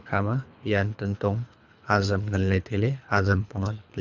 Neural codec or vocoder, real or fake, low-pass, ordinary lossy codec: codec, 24 kHz, 3 kbps, HILCodec; fake; 7.2 kHz; none